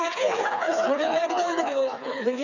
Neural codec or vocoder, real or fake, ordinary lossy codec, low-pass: codec, 16 kHz, 4 kbps, FreqCodec, smaller model; fake; none; 7.2 kHz